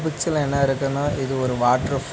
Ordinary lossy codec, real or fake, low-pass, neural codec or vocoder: none; real; none; none